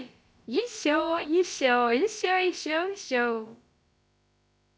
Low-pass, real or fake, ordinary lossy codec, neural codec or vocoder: none; fake; none; codec, 16 kHz, about 1 kbps, DyCAST, with the encoder's durations